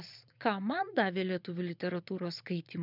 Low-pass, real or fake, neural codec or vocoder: 5.4 kHz; fake; vocoder, 22.05 kHz, 80 mel bands, Vocos